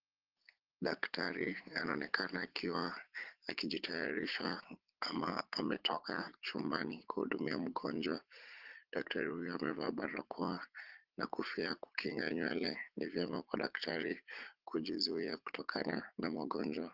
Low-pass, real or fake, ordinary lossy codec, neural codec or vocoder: 5.4 kHz; fake; Opus, 16 kbps; vocoder, 44.1 kHz, 80 mel bands, Vocos